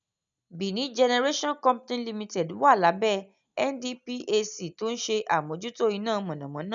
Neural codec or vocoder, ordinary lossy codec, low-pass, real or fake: none; none; 7.2 kHz; real